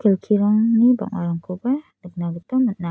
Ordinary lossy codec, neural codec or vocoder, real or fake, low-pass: none; none; real; none